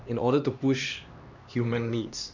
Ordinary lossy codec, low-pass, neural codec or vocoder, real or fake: none; 7.2 kHz; codec, 16 kHz, 2 kbps, X-Codec, HuBERT features, trained on LibriSpeech; fake